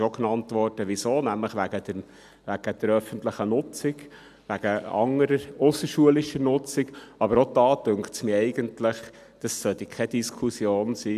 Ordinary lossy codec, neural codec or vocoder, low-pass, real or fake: none; none; 14.4 kHz; real